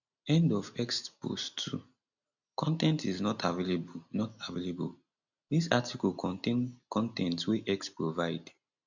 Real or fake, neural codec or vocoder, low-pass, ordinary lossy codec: real; none; 7.2 kHz; none